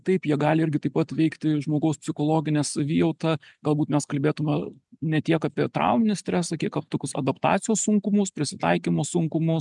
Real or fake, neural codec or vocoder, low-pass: real; none; 10.8 kHz